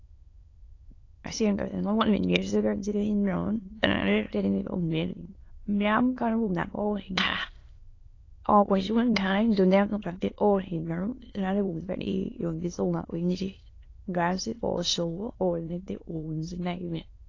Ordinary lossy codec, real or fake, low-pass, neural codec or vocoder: AAC, 32 kbps; fake; 7.2 kHz; autoencoder, 22.05 kHz, a latent of 192 numbers a frame, VITS, trained on many speakers